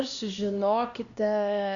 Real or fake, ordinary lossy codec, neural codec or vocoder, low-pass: fake; Opus, 64 kbps; codec, 16 kHz, 1 kbps, X-Codec, HuBERT features, trained on LibriSpeech; 7.2 kHz